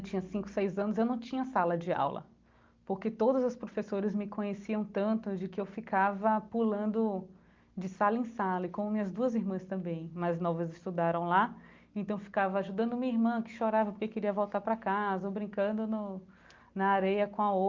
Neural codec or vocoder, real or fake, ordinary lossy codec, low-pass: none; real; Opus, 24 kbps; 7.2 kHz